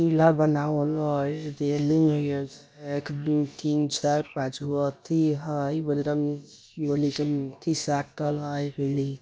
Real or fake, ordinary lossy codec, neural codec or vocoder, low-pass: fake; none; codec, 16 kHz, about 1 kbps, DyCAST, with the encoder's durations; none